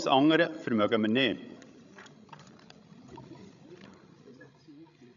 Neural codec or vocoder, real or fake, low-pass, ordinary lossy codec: codec, 16 kHz, 16 kbps, FreqCodec, larger model; fake; 7.2 kHz; none